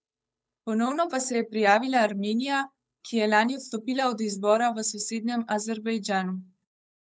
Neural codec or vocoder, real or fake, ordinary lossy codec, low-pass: codec, 16 kHz, 8 kbps, FunCodec, trained on Chinese and English, 25 frames a second; fake; none; none